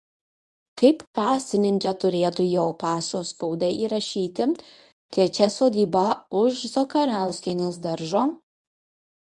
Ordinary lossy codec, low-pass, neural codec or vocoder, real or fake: MP3, 96 kbps; 10.8 kHz; codec, 24 kHz, 0.9 kbps, WavTokenizer, medium speech release version 1; fake